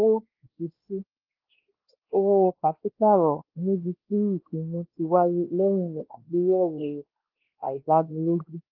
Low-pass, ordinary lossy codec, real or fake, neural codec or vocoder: 5.4 kHz; Opus, 16 kbps; fake; codec, 16 kHz, 1 kbps, X-Codec, WavLM features, trained on Multilingual LibriSpeech